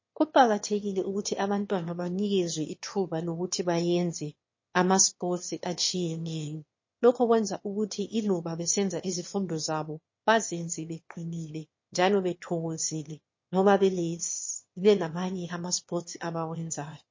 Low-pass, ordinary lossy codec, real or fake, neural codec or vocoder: 7.2 kHz; MP3, 32 kbps; fake; autoencoder, 22.05 kHz, a latent of 192 numbers a frame, VITS, trained on one speaker